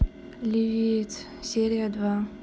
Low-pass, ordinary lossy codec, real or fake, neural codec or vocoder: none; none; real; none